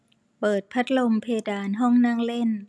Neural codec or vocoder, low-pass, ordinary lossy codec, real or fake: none; none; none; real